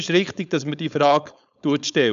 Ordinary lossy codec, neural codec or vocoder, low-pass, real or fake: none; codec, 16 kHz, 4.8 kbps, FACodec; 7.2 kHz; fake